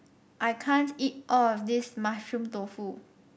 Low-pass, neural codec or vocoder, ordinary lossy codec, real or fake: none; none; none; real